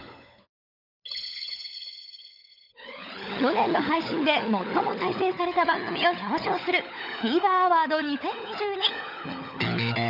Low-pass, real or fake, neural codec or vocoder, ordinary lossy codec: 5.4 kHz; fake; codec, 16 kHz, 16 kbps, FunCodec, trained on LibriTTS, 50 frames a second; none